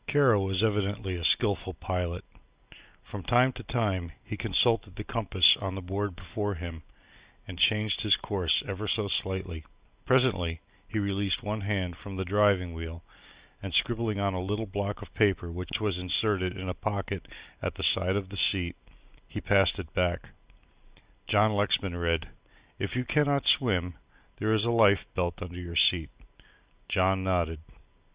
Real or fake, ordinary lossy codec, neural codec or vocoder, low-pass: real; Opus, 64 kbps; none; 3.6 kHz